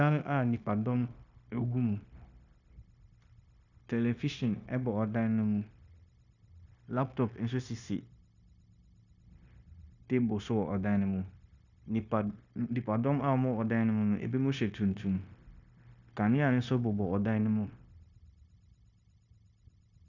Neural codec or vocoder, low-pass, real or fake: codec, 16 kHz, 0.9 kbps, LongCat-Audio-Codec; 7.2 kHz; fake